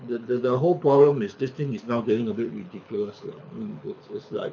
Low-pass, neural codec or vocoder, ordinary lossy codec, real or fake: 7.2 kHz; codec, 24 kHz, 6 kbps, HILCodec; none; fake